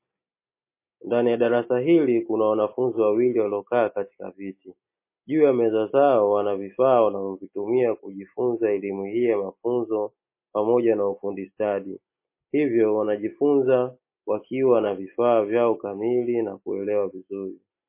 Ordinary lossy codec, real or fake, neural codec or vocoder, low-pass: MP3, 24 kbps; real; none; 3.6 kHz